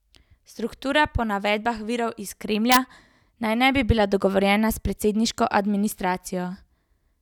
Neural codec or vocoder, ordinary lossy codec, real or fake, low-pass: none; none; real; 19.8 kHz